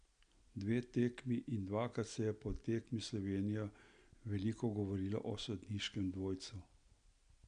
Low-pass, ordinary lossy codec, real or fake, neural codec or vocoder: 9.9 kHz; none; real; none